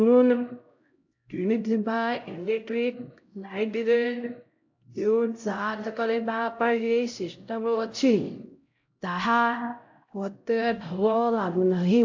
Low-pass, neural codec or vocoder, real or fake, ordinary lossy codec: 7.2 kHz; codec, 16 kHz, 0.5 kbps, X-Codec, HuBERT features, trained on LibriSpeech; fake; AAC, 48 kbps